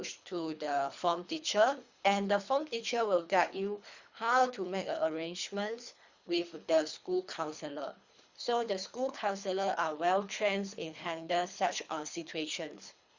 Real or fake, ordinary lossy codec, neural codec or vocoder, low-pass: fake; Opus, 64 kbps; codec, 24 kHz, 3 kbps, HILCodec; 7.2 kHz